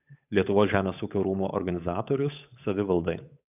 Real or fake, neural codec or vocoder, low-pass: fake; codec, 16 kHz, 8 kbps, FunCodec, trained on Chinese and English, 25 frames a second; 3.6 kHz